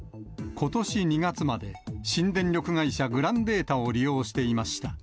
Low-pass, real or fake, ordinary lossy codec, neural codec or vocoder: none; real; none; none